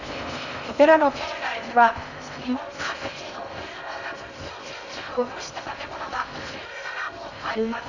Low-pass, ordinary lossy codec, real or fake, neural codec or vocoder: 7.2 kHz; none; fake; codec, 16 kHz in and 24 kHz out, 0.6 kbps, FocalCodec, streaming, 4096 codes